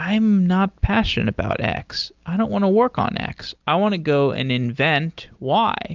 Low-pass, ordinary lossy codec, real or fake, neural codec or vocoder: 7.2 kHz; Opus, 24 kbps; real; none